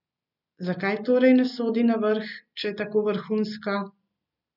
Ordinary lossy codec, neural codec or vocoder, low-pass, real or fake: none; none; 5.4 kHz; real